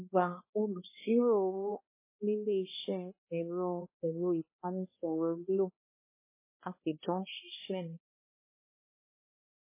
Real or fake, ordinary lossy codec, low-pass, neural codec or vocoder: fake; MP3, 16 kbps; 3.6 kHz; codec, 16 kHz, 1 kbps, X-Codec, HuBERT features, trained on balanced general audio